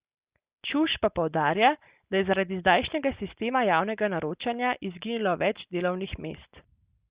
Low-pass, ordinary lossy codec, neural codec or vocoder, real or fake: 3.6 kHz; Opus, 64 kbps; none; real